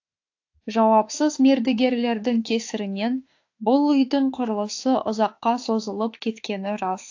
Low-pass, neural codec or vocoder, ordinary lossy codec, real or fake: 7.2 kHz; autoencoder, 48 kHz, 32 numbers a frame, DAC-VAE, trained on Japanese speech; AAC, 48 kbps; fake